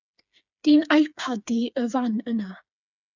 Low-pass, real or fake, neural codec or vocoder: 7.2 kHz; fake; codec, 16 kHz, 4 kbps, FreqCodec, smaller model